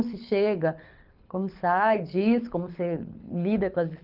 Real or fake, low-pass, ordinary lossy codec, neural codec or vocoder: fake; 5.4 kHz; Opus, 32 kbps; codec, 24 kHz, 6 kbps, HILCodec